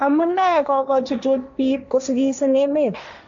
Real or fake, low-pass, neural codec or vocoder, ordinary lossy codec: fake; 7.2 kHz; codec, 16 kHz, 1.1 kbps, Voila-Tokenizer; MP3, 64 kbps